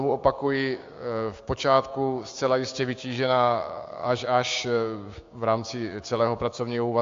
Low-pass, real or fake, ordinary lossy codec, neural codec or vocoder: 7.2 kHz; fake; AAC, 48 kbps; codec, 16 kHz, 6 kbps, DAC